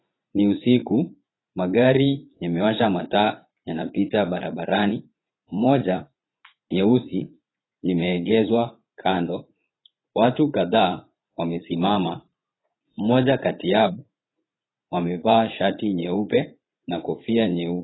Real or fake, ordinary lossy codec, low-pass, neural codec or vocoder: fake; AAC, 16 kbps; 7.2 kHz; vocoder, 44.1 kHz, 80 mel bands, Vocos